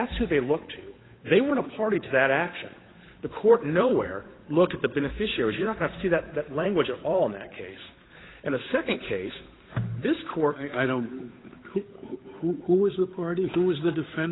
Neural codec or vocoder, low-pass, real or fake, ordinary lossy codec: codec, 16 kHz, 8 kbps, FunCodec, trained on Chinese and English, 25 frames a second; 7.2 kHz; fake; AAC, 16 kbps